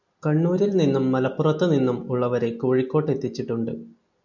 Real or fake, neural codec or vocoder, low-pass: real; none; 7.2 kHz